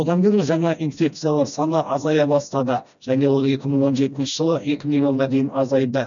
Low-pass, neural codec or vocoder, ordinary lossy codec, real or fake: 7.2 kHz; codec, 16 kHz, 1 kbps, FreqCodec, smaller model; none; fake